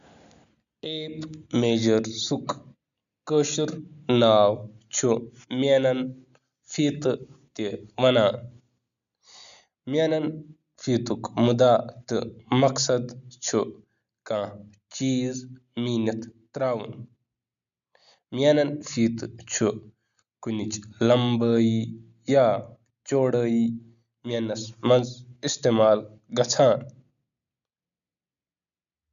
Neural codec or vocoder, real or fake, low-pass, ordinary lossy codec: none; real; 7.2 kHz; none